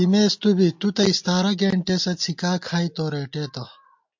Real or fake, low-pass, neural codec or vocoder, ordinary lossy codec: real; 7.2 kHz; none; MP3, 48 kbps